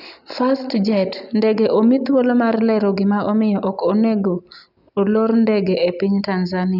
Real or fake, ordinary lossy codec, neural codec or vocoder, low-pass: real; none; none; 5.4 kHz